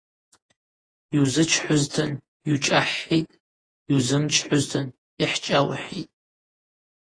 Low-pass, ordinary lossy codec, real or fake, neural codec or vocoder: 9.9 kHz; AAC, 32 kbps; fake; vocoder, 48 kHz, 128 mel bands, Vocos